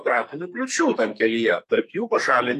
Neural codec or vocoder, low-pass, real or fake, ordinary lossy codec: codec, 32 kHz, 1.9 kbps, SNAC; 14.4 kHz; fake; AAC, 64 kbps